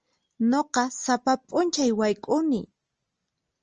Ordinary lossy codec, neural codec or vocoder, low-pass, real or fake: Opus, 24 kbps; none; 7.2 kHz; real